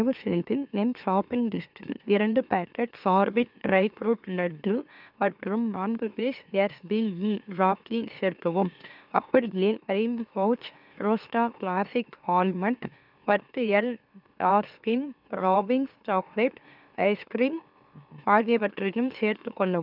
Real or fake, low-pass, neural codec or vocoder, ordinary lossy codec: fake; 5.4 kHz; autoencoder, 44.1 kHz, a latent of 192 numbers a frame, MeloTTS; none